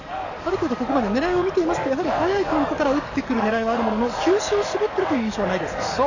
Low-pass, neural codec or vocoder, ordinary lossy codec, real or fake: 7.2 kHz; codec, 44.1 kHz, 7.8 kbps, DAC; none; fake